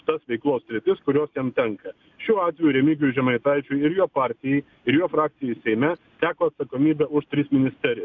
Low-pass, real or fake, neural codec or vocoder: 7.2 kHz; real; none